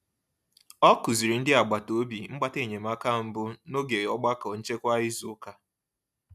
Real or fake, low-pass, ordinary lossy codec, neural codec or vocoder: real; 14.4 kHz; none; none